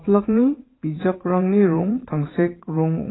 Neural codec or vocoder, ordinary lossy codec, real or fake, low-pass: vocoder, 22.05 kHz, 80 mel bands, WaveNeXt; AAC, 16 kbps; fake; 7.2 kHz